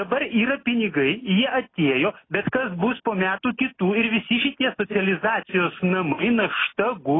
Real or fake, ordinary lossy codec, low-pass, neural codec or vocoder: real; AAC, 16 kbps; 7.2 kHz; none